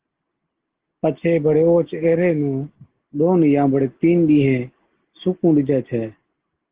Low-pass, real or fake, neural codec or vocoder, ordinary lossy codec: 3.6 kHz; real; none; Opus, 16 kbps